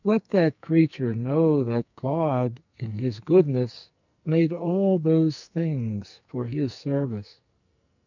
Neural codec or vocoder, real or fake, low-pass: codec, 44.1 kHz, 2.6 kbps, SNAC; fake; 7.2 kHz